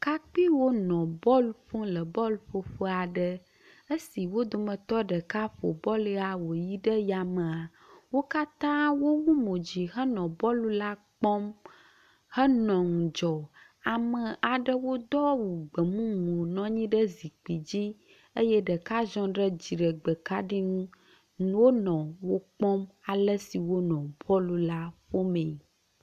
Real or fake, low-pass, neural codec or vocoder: real; 14.4 kHz; none